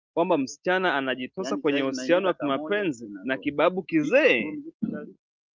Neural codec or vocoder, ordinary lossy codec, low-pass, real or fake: none; Opus, 24 kbps; 7.2 kHz; real